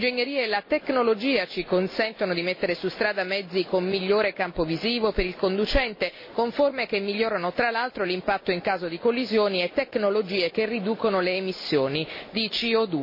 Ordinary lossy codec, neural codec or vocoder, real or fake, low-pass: MP3, 24 kbps; none; real; 5.4 kHz